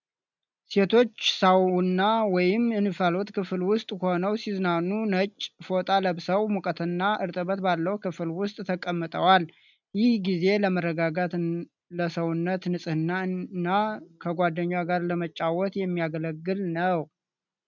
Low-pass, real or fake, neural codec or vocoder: 7.2 kHz; real; none